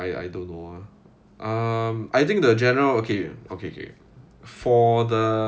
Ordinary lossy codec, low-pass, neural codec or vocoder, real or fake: none; none; none; real